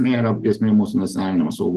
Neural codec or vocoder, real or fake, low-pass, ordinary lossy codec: none; real; 14.4 kHz; Opus, 16 kbps